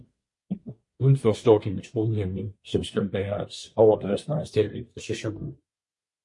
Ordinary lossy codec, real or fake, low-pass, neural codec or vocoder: MP3, 48 kbps; fake; 10.8 kHz; codec, 24 kHz, 1 kbps, SNAC